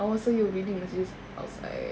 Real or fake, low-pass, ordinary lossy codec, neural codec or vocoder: real; none; none; none